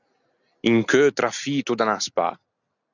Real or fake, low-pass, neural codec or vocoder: real; 7.2 kHz; none